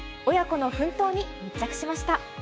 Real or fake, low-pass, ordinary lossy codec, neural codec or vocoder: fake; none; none; codec, 16 kHz, 6 kbps, DAC